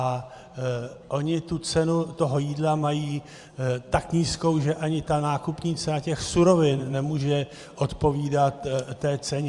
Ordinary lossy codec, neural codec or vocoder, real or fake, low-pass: Opus, 64 kbps; vocoder, 44.1 kHz, 128 mel bands every 512 samples, BigVGAN v2; fake; 10.8 kHz